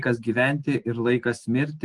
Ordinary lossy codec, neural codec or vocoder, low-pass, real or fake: Opus, 24 kbps; none; 10.8 kHz; real